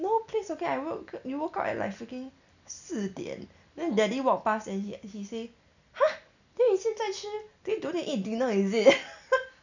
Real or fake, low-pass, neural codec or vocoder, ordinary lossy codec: real; 7.2 kHz; none; none